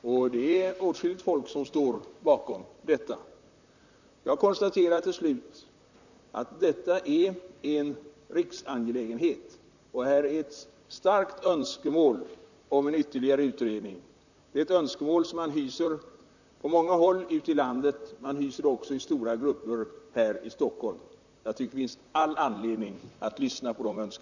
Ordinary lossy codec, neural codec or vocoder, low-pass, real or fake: none; vocoder, 44.1 kHz, 128 mel bands, Pupu-Vocoder; 7.2 kHz; fake